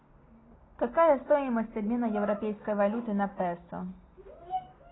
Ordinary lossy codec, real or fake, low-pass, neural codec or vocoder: AAC, 16 kbps; real; 7.2 kHz; none